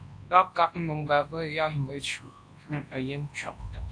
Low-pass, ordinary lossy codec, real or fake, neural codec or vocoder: 9.9 kHz; AAC, 48 kbps; fake; codec, 24 kHz, 0.9 kbps, WavTokenizer, large speech release